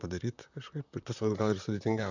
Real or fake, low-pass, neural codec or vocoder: fake; 7.2 kHz; vocoder, 44.1 kHz, 128 mel bands, Pupu-Vocoder